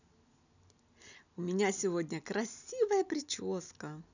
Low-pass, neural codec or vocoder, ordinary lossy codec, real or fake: 7.2 kHz; none; none; real